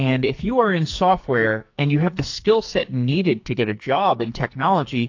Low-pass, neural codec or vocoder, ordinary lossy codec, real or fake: 7.2 kHz; codec, 44.1 kHz, 2.6 kbps, SNAC; AAC, 48 kbps; fake